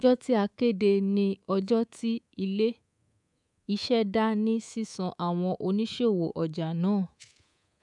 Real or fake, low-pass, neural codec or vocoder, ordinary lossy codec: fake; 10.8 kHz; codec, 24 kHz, 3.1 kbps, DualCodec; none